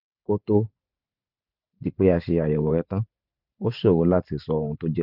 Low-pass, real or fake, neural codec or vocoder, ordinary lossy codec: 5.4 kHz; fake; vocoder, 44.1 kHz, 128 mel bands every 512 samples, BigVGAN v2; none